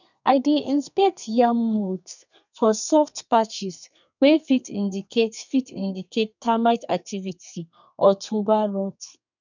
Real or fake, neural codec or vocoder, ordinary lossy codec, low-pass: fake; codec, 32 kHz, 1.9 kbps, SNAC; none; 7.2 kHz